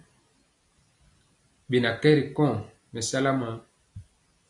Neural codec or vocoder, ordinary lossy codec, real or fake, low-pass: none; MP3, 64 kbps; real; 10.8 kHz